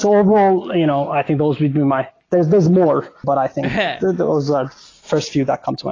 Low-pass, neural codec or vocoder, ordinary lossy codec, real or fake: 7.2 kHz; none; AAC, 32 kbps; real